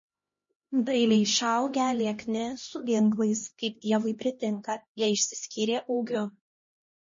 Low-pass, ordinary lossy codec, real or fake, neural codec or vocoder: 7.2 kHz; MP3, 32 kbps; fake; codec, 16 kHz, 1 kbps, X-Codec, HuBERT features, trained on LibriSpeech